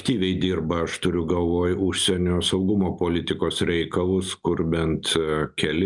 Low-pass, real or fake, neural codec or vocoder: 10.8 kHz; real; none